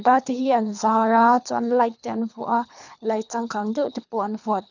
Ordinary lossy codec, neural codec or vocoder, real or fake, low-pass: none; codec, 24 kHz, 3 kbps, HILCodec; fake; 7.2 kHz